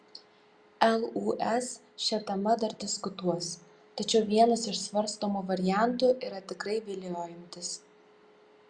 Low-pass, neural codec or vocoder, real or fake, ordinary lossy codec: 9.9 kHz; none; real; Opus, 64 kbps